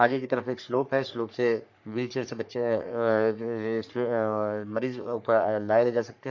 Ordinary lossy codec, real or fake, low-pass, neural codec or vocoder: none; fake; 7.2 kHz; codec, 44.1 kHz, 3.4 kbps, Pupu-Codec